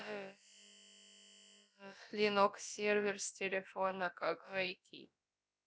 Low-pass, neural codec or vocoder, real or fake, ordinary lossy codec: none; codec, 16 kHz, about 1 kbps, DyCAST, with the encoder's durations; fake; none